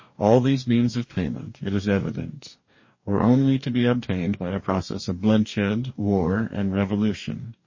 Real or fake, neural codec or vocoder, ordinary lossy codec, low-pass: fake; codec, 44.1 kHz, 2.6 kbps, DAC; MP3, 32 kbps; 7.2 kHz